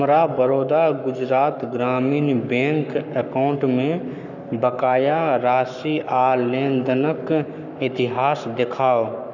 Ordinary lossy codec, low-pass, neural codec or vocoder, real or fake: none; 7.2 kHz; codec, 44.1 kHz, 7.8 kbps, Pupu-Codec; fake